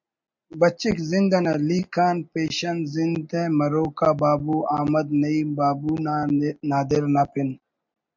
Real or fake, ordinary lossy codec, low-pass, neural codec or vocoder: real; MP3, 64 kbps; 7.2 kHz; none